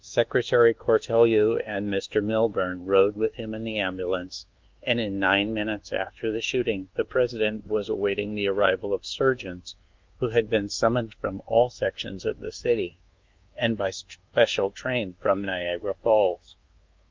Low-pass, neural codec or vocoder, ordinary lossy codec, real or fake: 7.2 kHz; codec, 24 kHz, 1.2 kbps, DualCodec; Opus, 32 kbps; fake